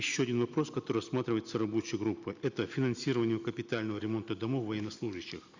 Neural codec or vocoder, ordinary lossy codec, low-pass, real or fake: none; Opus, 64 kbps; 7.2 kHz; real